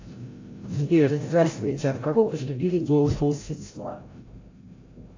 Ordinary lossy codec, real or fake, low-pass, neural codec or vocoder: AAC, 32 kbps; fake; 7.2 kHz; codec, 16 kHz, 0.5 kbps, FreqCodec, larger model